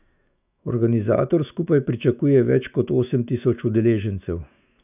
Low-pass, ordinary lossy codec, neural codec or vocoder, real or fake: 3.6 kHz; none; autoencoder, 48 kHz, 128 numbers a frame, DAC-VAE, trained on Japanese speech; fake